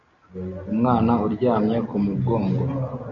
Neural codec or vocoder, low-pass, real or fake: none; 7.2 kHz; real